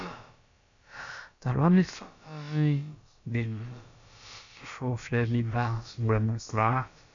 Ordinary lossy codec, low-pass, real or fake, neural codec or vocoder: AAC, 64 kbps; 7.2 kHz; fake; codec, 16 kHz, about 1 kbps, DyCAST, with the encoder's durations